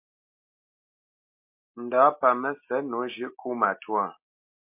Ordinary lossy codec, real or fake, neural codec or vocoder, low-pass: MP3, 32 kbps; real; none; 3.6 kHz